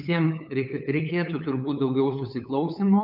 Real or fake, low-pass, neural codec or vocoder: fake; 5.4 kHz; codec, 16 kHz, 8 kbps, FunCodec, trained on LibriTTS, 25 frames a second